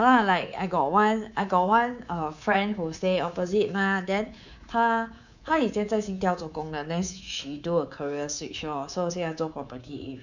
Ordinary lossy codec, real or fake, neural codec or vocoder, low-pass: none; fake; codec, 24 kHz, 3.1 kbps, DualCodec; 7.2 kHz